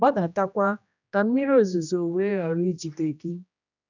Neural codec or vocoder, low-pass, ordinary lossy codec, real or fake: codec, 16 kHz, 1 kbps, X-Codec, HuBERT features, trained on general audio; 7.2 kHz; none; fake